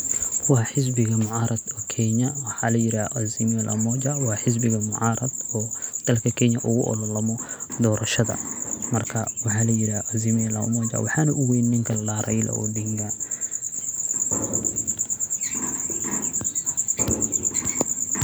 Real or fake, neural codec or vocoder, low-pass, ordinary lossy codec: real; none; none; none